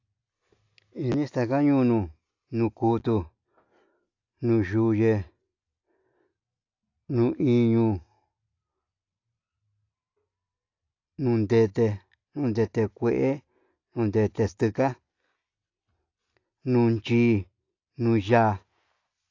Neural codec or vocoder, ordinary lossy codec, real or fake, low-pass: none; AAC, 48 kbps; real; 7.2 kHz